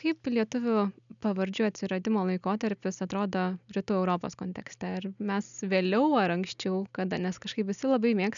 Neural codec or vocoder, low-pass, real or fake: none; 7.2 kHz; real